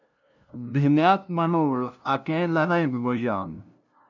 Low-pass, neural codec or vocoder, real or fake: 7.2 kHz; codec, 16 kHz, 0.5 kbps, FunCodec, trained on LibriTTS, 25 frames a second; fake